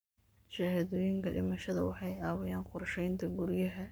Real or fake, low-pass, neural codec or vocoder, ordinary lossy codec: fake; none; codec, 44.1 kHz, 7.8 kbps, Pupu-Codec; none